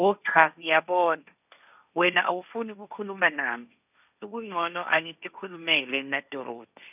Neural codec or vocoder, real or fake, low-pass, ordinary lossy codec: codec, 16 kHz, 1.1 kbps, Voila-Tokenizer; fake; 3.6 kHz; none